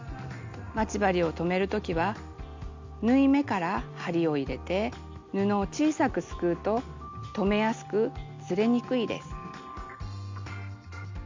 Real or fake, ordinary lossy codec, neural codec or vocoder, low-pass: real; MP3, 64 kbps; none; 7.2 kHz